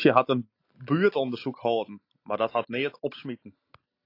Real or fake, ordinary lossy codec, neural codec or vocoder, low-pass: real; AAC, 32 kbps; none; 5.4 kHz